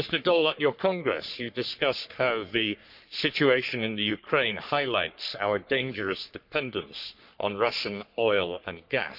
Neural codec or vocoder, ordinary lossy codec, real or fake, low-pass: codec, 44.1 kHz, 3.4 kbps, Pupu-Codec; none; fake; 5.4 kHz